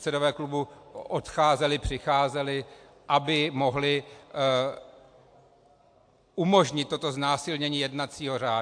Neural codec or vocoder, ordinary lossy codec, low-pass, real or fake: none; AAC, 64 kbps; 9.9 kHz; real